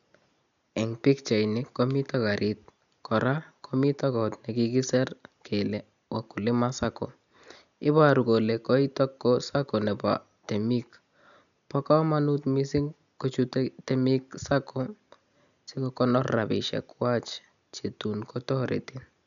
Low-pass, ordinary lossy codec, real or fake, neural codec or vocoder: 7.2 kHz; none; real; none